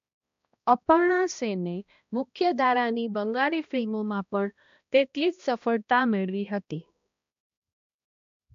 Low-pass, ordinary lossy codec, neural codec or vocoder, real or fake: 7.2 kHz; AAC, 64 kbps; codec, 16 kHz, 1 kbps, X-Codec, HuBERT features, trained on balanced general audio; fake